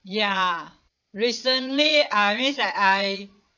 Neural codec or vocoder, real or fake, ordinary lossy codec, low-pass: vocoder, 22.05 kHz, 80 mel bands, Vocos; fake; none; 7.2 kHz